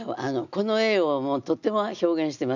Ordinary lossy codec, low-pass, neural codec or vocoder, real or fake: none; 7.2 kHz; none; real